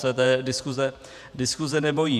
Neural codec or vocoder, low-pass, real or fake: vocoder, 48 kHz, 128 mel bands, Vocos; 14.4 kHz; fake